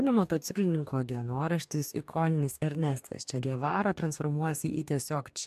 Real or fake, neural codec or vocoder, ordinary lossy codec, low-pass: fake; codec, 44.1 kHz, 2.6 kbps, DAC; MP3, 96 kbps; 14.4 kHz